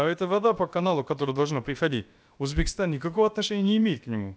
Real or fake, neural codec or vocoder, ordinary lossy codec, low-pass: fake; codec, 16 kHz, about 1 kbps, DyCAST, with the encoder's durations; none; none